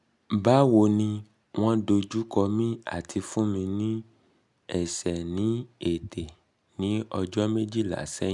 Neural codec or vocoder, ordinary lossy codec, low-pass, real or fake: none; none; 10.8 kHz; real